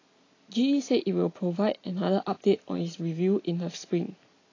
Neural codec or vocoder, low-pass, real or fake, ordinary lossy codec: none; 7.2 kHz; real; AAC, 32 kbps